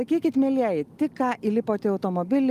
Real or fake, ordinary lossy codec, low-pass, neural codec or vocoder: real; Opus, 32 kbps; 14.4 kHz; none